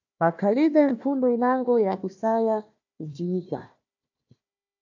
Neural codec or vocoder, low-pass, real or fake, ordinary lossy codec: codec, 16 kHz, 1 kbps, FunCodec, trained on Chinese and English, 50 frames a second; 7.2 kHz; fake; AAC, 48 kbps